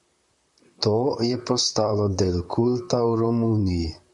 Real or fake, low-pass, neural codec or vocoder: fake; 10.8 kHz; vocoder, 44.1 kHz, 128 mel bands, Pupu-Vocoder